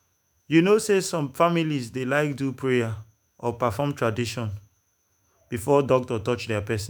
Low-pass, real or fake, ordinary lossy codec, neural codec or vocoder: none; fake; none; autoencoder, 48 kHz, 128 numbers a frame, DAC-VAE, trained on Japanese speech